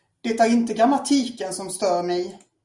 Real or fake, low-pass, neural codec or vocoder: real; 10.8 kHz; none